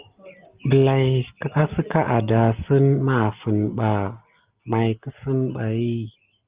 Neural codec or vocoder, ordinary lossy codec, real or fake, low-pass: none; Opus, 32 kbps; real; 3.6 kHz